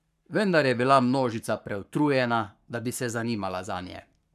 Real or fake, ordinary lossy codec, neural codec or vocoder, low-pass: fake; none; codec, 44.1 kHz, 7.8 kbps, Pupu-Codec; 14.4 kHz